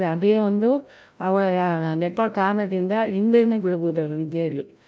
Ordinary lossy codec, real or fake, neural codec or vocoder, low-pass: none; fake; codec, 16 kHz, 0.5 kbps, FreqCodec, larger model; none